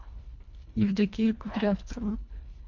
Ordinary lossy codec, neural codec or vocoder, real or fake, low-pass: MP3, 48 kbps; codec, 24 kHz, 1.5 kbps, HILCodec; fake; 7.2 kHz